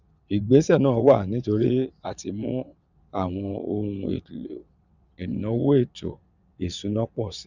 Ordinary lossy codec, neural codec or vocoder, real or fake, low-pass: none; vocoder, 22.05 kHz, 80 mel bands, WaveNeXt; fake; 7.2 kHz